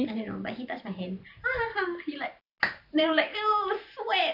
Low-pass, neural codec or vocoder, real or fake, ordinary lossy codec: 5.4 kHz; vocoder, 44.1 kHz, 128 mel bands every 512 samples, BigVGAN v2; fake; none